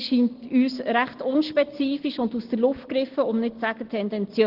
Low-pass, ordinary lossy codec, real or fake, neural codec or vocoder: 5.4 kHz; Opus, 16 kbps; real; none